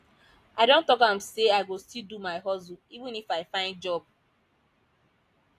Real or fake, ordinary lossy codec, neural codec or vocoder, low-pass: real; AAC, 64 kbps; none; 14.4 kHz